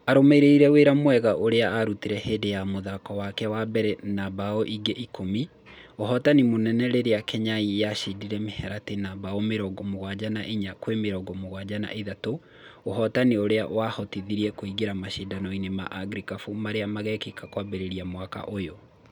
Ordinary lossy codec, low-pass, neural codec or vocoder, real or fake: none; 19.8 kHz; none; real